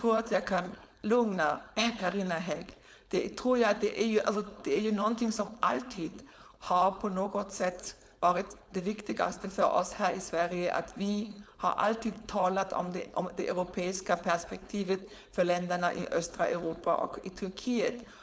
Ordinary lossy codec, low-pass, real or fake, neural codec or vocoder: none; none; fake; codec, 16 kHz, 4.8 kbps, FACodec